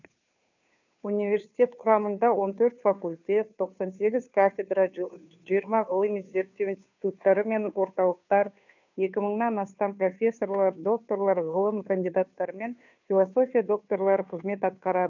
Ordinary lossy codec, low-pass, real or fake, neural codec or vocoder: none; 7.2 kHz; fake; codec, 16 kHz, 2 kbps, FunCodec, trained on Chinese and English, 25 frames a second